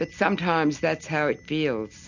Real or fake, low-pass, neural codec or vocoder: real; 7.2 kHz; none